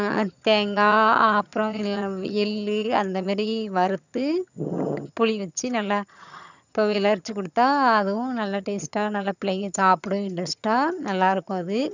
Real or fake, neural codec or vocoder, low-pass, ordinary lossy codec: fake; vocoder, 22.05 kHz, 80 mel bands, HiFi-GAN; 7.2 kHz; none